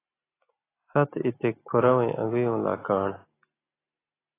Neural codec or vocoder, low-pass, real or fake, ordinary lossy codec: none; 3.6 kHz; real; AAC, 16 kbps